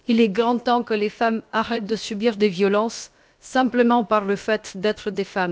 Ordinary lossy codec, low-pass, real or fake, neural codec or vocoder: none; none; fake; codec, 16 kHz, about 1 kbps, DyCAST, with the encoder's durations